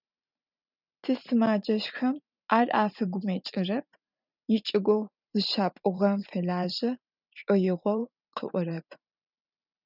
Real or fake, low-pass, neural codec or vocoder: fake; 5.4 kHz; vocoder, 44.1 kHz, 128 mel bands every 512 samples, BigVGAN v2